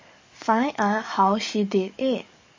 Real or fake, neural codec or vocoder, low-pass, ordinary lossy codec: fake; codec, 44.1 kHz, 7.8 kbps, DAC; 7.2 kHz; MP3, 32 kbps